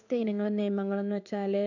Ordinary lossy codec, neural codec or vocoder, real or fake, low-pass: none; codec, 16 kHz in and 24 kHz out, 1 kbps, XY-Tokenizer; fake; 7.2 kHz